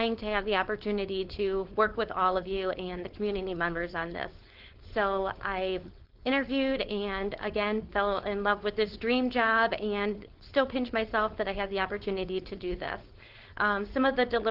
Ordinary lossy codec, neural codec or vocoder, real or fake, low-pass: Opus, 16 kbps; codec, 16 kHz, 4.8 kbps, FACodec; fake; 5.4 kHz